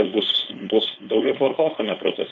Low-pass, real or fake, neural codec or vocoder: 7.2 kHz; fake; codec, 16 kHz, 4.8 kbps, FACodec